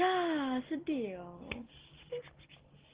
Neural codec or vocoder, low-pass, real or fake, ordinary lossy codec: none; 3.6 kHz; real; Opus, 16 kbps